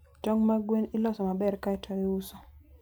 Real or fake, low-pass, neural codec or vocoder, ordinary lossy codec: real; none; none; none